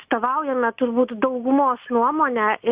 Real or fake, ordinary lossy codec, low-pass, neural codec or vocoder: real; Opus, 64 kbps; 3.6 kHz; none